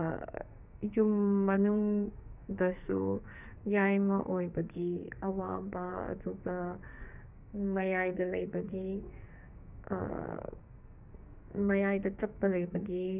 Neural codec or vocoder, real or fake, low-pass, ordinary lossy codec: codec, 44.1 kHz, 2.6 kbps, SNAC; fake; 3.6 kHz; none